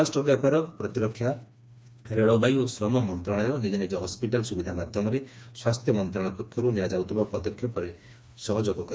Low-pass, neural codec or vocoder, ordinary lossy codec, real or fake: none; codec, 16 kHz, 2 kbps, FreqCodec, smaller model; none; fake